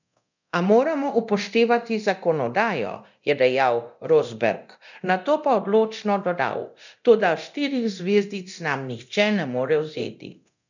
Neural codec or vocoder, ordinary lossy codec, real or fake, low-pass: codec, 24 kHz, 0.9 kbps, DualCodec; none; fake; 7.2 kHz